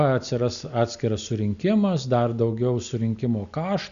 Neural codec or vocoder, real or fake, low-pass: none; real; 7.2 kHz